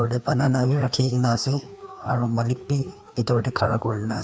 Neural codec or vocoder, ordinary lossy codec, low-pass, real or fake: codec, 16 kHz, 2 kbps, FreqCodec, larger model; none; none; fake